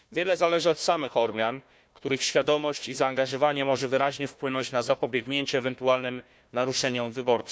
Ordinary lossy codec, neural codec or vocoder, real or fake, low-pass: none; codec, 16 kHz, 1 kbps, FunCodec, trained on Chinese and English, 50 frames a second; fake; none